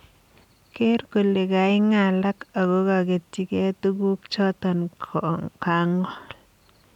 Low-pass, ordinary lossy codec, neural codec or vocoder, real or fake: 19.8 kHz; none; none; real